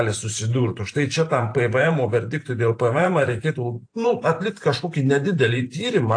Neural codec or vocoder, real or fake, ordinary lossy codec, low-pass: vocoder, 44.1 kHz, 128 mel bands every 512 samples, BigVGAN v2; fake; AAC, 48 kbps; 9.9 kHz